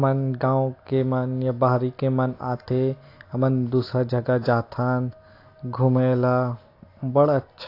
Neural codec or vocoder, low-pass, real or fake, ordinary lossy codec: none; 5.4 kHz; real; AAC, 32 kbps